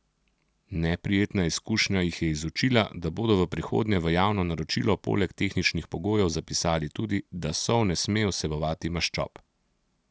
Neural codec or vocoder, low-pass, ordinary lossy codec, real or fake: none; none; none; real